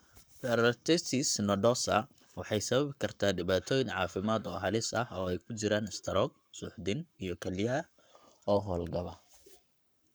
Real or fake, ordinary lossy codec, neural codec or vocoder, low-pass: fake; none; codec, 44.1 kHz, 7.8 kbps, Pupu-Codec; none